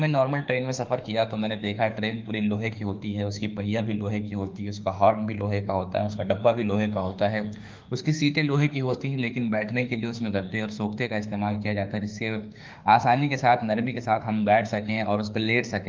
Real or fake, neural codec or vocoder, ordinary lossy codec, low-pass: fake; autoencoder, 48 kHz, 32 numbers a frame, DAC-VAE, trained on Japanese speech; Opus, 32 kbps; 7.2 kHz